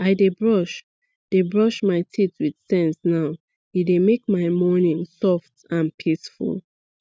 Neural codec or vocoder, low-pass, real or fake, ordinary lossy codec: none; none; real; none